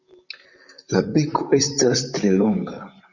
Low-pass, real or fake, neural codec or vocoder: 7.2 kHz; fake; vocoder, 44.1 kHz, 128 mel bands, Pupu-Vocoder